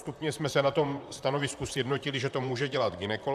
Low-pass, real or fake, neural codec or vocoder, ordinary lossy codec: 14.4 kHz; fake; vocoder, 44.1 kHz, 128 mel bands, Pupu-Vocoder; AAC, 96 kbps